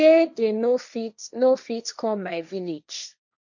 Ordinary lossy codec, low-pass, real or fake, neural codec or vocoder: none; 7.2 kHz; fake; codec, 16 kHz, 1.1 kbps, Voila-Tokenizer